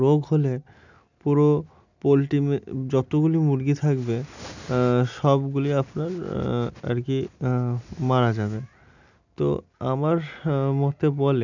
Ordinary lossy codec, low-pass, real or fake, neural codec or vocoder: none; 7.2 kHz; real; none